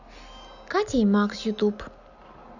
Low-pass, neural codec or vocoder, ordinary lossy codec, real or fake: 7.2 kHz; none; none; real